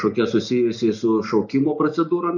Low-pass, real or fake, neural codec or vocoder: 7.2 kHz; real; none